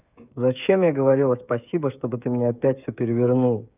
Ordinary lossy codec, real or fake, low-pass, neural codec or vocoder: none; fake; 3.6 kHz; codec, 16 kHz, 8 kbps, FreqCodec, smaller model